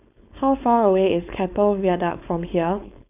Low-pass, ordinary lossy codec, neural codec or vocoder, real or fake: 3.6 kHz; none; codec, 16 kHz, 4.8 kbps, FACodec; fake